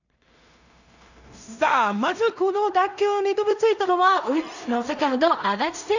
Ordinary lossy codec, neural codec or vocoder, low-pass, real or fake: none; codec, 16 kHz in and 24 kHz out, 0.4 kbps, LongCat-Audio-Codec, two codebook decoder; 7.2 kHz; fake